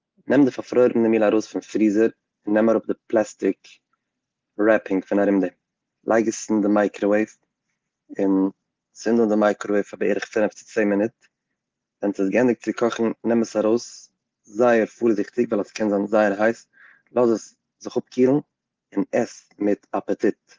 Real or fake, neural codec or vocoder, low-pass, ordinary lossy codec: real; none; 7.2 kHz; Opus, 16 kbps